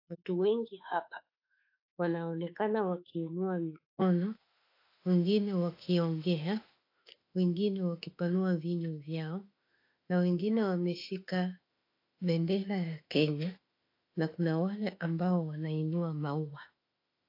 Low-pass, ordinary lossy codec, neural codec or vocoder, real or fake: 5.4 kHz; AAC, 32 kbps; autoencoder, 48 kHz, 32 numbers a frame, DAC-VAE, trained on Japanese speech; fake